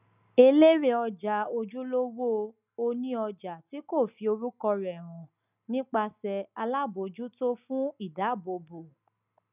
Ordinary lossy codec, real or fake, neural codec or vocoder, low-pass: none; real; none; 3.6 kHz